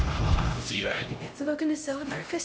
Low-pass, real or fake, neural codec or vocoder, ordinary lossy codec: none; fake; codec, 16 kHz, 0.5 kbps, X-Codec, HuBERT features, trained on LibriSpeech; none